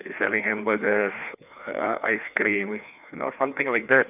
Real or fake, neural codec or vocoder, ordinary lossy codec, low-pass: fake; codec, 16 kHz, 2 kbps, FreqCodec, larger model; none; 3.6 kHz